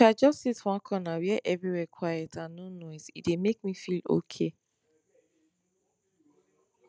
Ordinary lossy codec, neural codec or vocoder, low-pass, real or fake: none; none; none; real